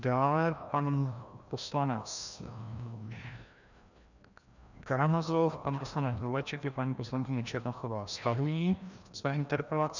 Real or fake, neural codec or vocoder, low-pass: fake; codec, 16 kHz, 1 kbps, FreqCodec, larger model; 7.2 kHz